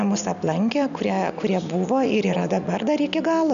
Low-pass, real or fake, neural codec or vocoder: 7.2 kHz; real; none